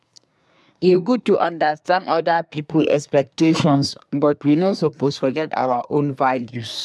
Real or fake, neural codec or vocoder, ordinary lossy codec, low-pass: fake; codec, 24 kHz, 1 kbps, SNAC; none; none